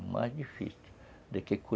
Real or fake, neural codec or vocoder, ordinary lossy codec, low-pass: real; none; none; none